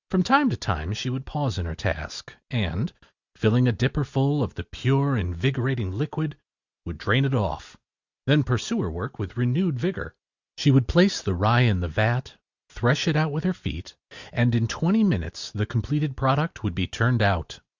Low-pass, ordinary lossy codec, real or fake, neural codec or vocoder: 7.2 kHz; Opus, 64 kbps; real; none